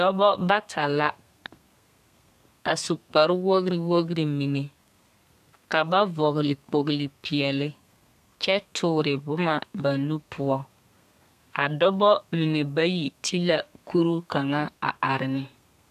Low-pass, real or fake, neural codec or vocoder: 14.4 kHz; fake; codec, 32 kHz, 1.9 kbps, SNAC